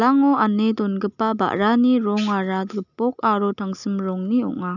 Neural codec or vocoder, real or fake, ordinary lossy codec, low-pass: none; real; none; 7.2 kHz